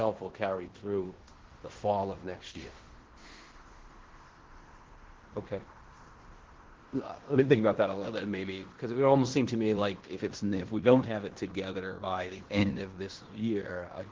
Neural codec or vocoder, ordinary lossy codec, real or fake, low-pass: codec, 16 kHz in and 24 kHz out, 0.9 kbps, LongCat-Audio-Codec, fine tuned four codebook decoder; Opus, 16 kbps; fake; 7.2 kHz